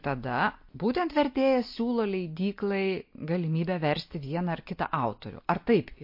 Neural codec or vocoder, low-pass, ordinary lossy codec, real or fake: none; 5.4 kHz; MP3, 32 kbps; real